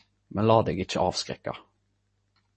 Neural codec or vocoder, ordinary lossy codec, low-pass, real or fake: none; MP3, 32 kbps; 10.8 kHz; real